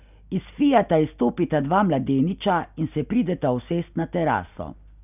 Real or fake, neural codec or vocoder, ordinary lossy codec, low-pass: real; none; none; 3.6 kHz